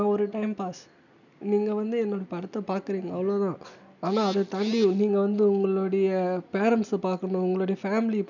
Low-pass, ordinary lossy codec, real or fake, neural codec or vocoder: 7.2 kHz; none; real; none